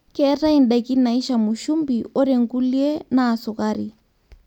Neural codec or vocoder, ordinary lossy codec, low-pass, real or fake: none; none; 19.8 kHz; real